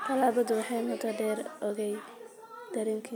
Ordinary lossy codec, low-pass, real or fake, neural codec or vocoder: none; none; real; none